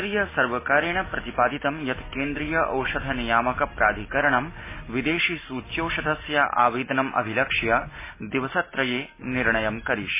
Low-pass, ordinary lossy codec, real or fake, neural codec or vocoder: 3.6 kHz; MP3, 16 kbps; real; none